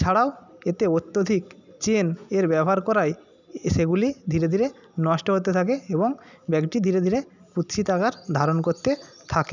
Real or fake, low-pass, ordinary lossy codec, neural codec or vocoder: real; 7.2 kHz; none; none